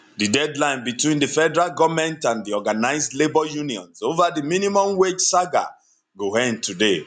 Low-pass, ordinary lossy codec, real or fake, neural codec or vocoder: 9.9 kHz; none; real; none